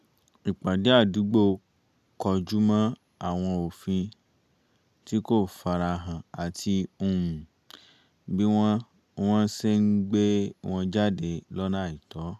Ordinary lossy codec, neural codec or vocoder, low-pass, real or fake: none; none; 14.4 kHz; real